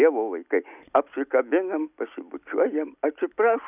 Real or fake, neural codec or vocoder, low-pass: real; none; 3.6 kHz